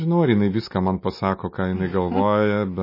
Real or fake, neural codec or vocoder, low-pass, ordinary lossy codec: real; none; 5.4 kHz; MP3, 24 kbps